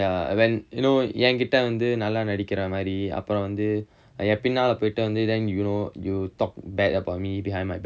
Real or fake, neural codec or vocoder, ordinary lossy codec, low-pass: real; none; none; none